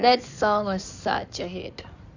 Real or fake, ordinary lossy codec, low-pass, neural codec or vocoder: fake; none; 7.2 kHz; codec, 16 kHz in and 24 kHz out, 2.2 kbps, FireRedTTS-2 codec